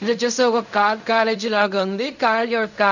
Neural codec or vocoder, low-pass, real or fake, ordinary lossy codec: codec, 16 kHz in and 24 kHz out, 0.4 kbps, LongCat-Audio-Codec, fine tuned four codebook decoder; 7.2 kHz; fake; none